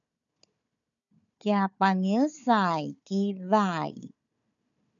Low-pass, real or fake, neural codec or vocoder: 7.2 kHz; fake; codec, 16 kHz, 4 kbps, FunCodec, trained on Chinese and English, 50 frames a second